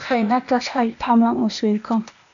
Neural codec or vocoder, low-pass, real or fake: codec, 16 kHz, 0.8 kbps, ZipCodec; 7.2 kHz; fake